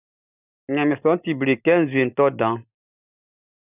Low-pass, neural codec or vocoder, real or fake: 3.6 kHz; none; real